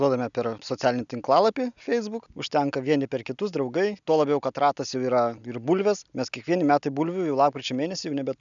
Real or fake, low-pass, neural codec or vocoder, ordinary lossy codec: real; 7.2 kHz; none; MP3, 96 kbps